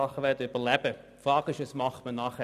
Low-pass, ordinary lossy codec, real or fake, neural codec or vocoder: 14.4 kHz; none; real; none